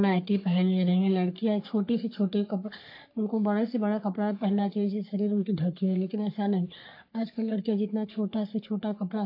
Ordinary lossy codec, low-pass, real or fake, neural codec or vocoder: AAC, 32 kbps; 5.4 kHz; fake; codec, 44.1 kHz, 3.4 kbps, Pupu-Codec